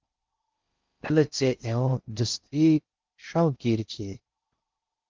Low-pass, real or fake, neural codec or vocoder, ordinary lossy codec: 7.2 kHz; fake; codec, 16 kHz in and 24 kHz out, 0.6 kbps, FocalCodec, streaming, 4096 codes; Opus, 32 kbps